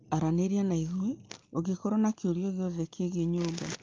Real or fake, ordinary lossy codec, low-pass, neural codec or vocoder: real; Opus, 24 kbps; 7.2 kHz; none